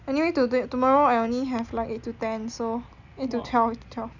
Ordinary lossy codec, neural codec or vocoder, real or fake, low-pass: none; none; real; 7.2 kHz